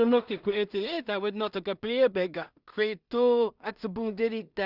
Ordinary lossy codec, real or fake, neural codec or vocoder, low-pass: Opus, 64 kbps; fake; codec, 16 kHz in and 24 kHz out, 0.4 kbps, LongCat-Audio-Codec, two codebook decoder; 5.4 kHz